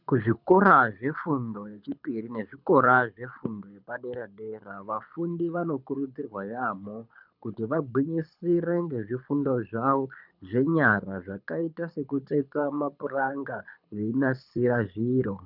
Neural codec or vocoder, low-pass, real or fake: codec, 24 kHz, 6 kbps, HILCodec; 5.4 kHz; fake